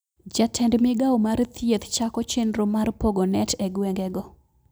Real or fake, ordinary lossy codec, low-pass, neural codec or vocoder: real; none; none; none